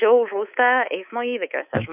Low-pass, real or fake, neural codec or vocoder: 3.6 kHz; fake; codec, 24 kHz, 3.1 kbps, DualCodec